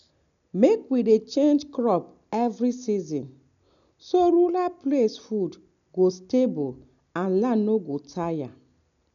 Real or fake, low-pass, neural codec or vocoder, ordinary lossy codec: real; 7.2 kHz; none; none